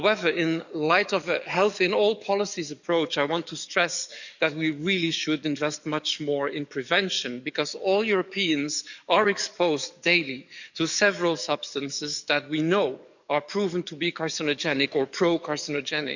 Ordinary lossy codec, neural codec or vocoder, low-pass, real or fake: none; codec, 44.1 kHz, 7.8 kbps, DAC; 7.2 kHz; fake